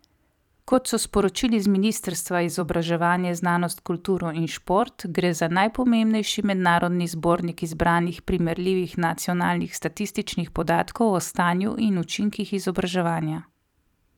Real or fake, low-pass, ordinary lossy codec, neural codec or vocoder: fake; 19.8 kHz; none; vocoder, 44.1 kHz, 128 mel bands every 256 samples, BigVGAN v2